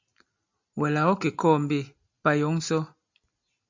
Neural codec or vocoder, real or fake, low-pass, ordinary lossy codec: none; real; 7.2 kHz; MP3, 64 kbps